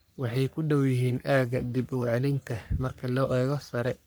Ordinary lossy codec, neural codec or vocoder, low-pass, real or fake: none; codec, 44.1 kHz, 3.4 kbps, Pupu-Codec; none; fake